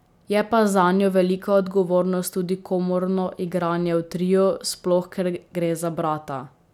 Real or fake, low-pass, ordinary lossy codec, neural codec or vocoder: real; 19.8 kHz; none; none